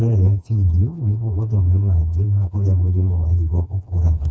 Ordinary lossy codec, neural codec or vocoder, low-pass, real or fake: none; codec, 16 kHz, 2 kbps, FreqCodec, smaller model; none; fake